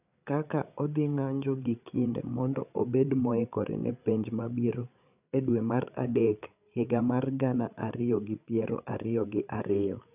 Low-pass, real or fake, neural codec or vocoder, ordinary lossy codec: 3.6 kHz; fake; codec, 16 kHz, 8 kbps, FreqCodec, larger model; none